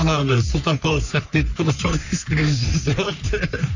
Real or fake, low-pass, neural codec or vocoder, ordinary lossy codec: fake; 7.2 kHz; codec, 44.1 kHz, 3.4 kbps, Pupu-Codec; none